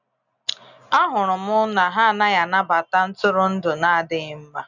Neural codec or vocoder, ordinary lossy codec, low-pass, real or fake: none; none; 7.2 kHz; real